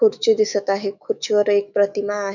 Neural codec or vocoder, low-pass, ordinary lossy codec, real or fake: autoencoder, 48 kHz, 128 numbers a frame, DAC-VAE, trained on Japanese speech; 7.2 kHz; none; fake